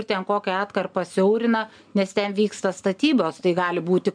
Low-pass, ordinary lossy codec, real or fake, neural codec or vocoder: 9.9 kHz; MP3, 96 kbps; real; none